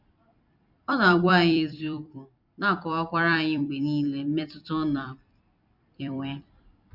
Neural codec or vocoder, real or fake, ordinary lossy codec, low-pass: vocoder, 44.1 kHz, 128 mel bands every 256 samples, BigVGAN v2; fake; none; 5.4 kHz